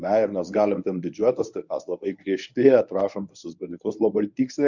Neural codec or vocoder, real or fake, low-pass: codec, 24 kHz, 0.9 kbps, WavTokenizer, medium speech release version 1; fake; 7.2 kHz